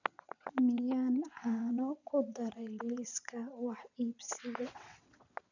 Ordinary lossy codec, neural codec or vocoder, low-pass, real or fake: none; vocoder, 22.05 kHz, 80 mel bands, WaveNeXt; 7.2 kHz; fake